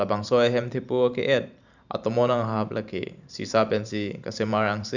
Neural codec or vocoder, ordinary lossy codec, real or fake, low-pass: vocoder, 44.1 kHz, 128 mel bands every 512 samples, BigVGAN v2; none; fake; 7.2 kHz